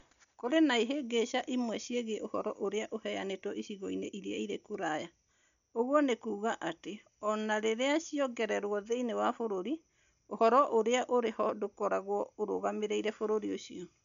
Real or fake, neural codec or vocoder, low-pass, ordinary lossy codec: real; none; 7.2 kHz; none